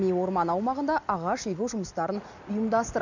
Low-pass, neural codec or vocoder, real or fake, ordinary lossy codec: 7.2 kHz; none; real; none